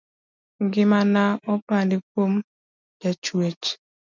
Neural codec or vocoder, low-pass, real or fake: none; 7.2 kHz; real